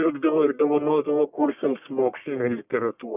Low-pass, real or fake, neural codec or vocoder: 3.6 kHz; fake; codec, 44.1 kHz, 1.7 kbps, Pupu-Codec